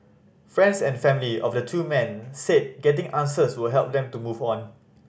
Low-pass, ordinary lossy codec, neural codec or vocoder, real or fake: none; none; none; real